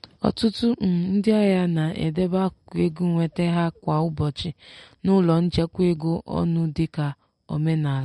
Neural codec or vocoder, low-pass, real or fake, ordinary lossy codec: none; 19.8 kHz; real; MP3, 48 kbps